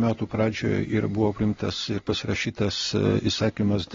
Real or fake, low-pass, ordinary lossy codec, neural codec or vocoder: real; 7.2 kHz; AAC, 24 kbps; none